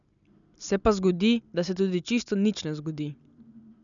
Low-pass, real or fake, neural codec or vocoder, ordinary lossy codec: 7.2 kHz; real; none; MP3, 96 kbps